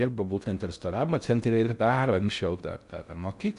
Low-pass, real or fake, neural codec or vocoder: 10.8 kHz; fake; codec, 16 kHz in and 24 kHz out, 0.6 kbps, FocalCodec, streaming, 4096 codes